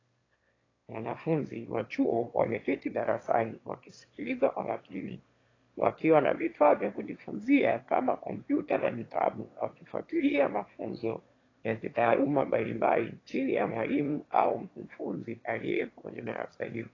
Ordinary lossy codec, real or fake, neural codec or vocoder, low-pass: AAC, 32 kbps; fake; autoencoder, 22.05 kHz, a latent of 192 numbers a frame, VITS, trained on one speaker; 7.2 kHz